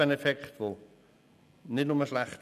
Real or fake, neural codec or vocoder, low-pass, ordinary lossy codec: real; none; 14.4 kHz; none